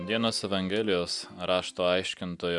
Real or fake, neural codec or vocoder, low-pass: real; none; 10.8 kHz